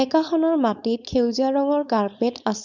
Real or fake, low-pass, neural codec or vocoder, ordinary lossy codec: fake; 7.2 kHz; codec, 16 kHz, 4.8 kbps, FACodec; none